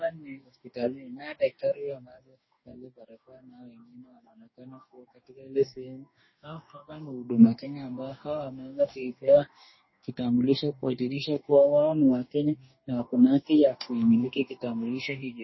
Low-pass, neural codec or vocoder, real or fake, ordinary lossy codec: 7.2 kHz; codec, 44.1 kHz, 2.6 kbps, DAC; fake; MP3, 24 kbps